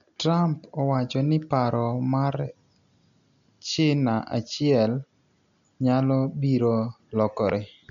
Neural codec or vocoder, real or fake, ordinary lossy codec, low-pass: none; real; none; 7.2 kHz